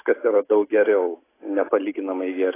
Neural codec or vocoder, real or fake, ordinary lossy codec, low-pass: none; real; AAC, 16 kbps; 3.6 kHz